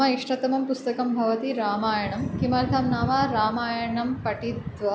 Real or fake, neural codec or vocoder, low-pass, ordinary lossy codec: real; none; none; none